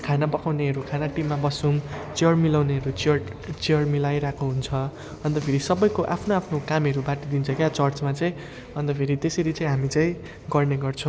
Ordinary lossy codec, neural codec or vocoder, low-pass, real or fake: none; none; none; real